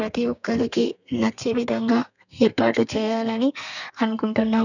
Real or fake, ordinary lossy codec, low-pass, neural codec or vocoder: fake; none; 7.2 kHz; codec, 32 kHz, 1.9 kbps, SNAC